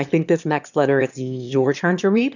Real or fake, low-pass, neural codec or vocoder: fake; 7.2 kHz; autoencoder, 22.05 kHz, a latent of 192 numbers a frame, VITS, trained on one speaker